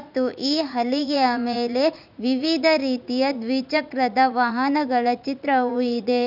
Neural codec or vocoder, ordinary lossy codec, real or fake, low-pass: vocoder, 44.1 kHz, 80 mel bands, Vocos; none; fake; 5.4 kHz